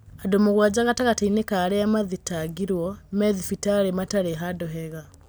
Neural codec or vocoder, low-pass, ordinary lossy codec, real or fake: none; none; none; real